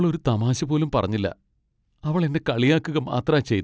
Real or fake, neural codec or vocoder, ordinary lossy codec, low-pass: real; none; none; none